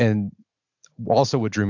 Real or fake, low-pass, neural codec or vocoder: real; 7.2 kHz; none